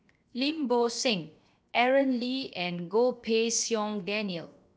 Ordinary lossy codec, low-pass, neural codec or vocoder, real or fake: none; none; codec, 16 kHz, 0.7 kbps, FocalCodec; fake